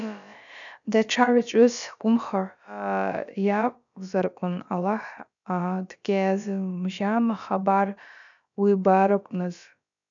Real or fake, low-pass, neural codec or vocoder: fake; 7.2 kHz; codec, 16 kHz, about 1 kbps, DyCAST, with the encoder's durations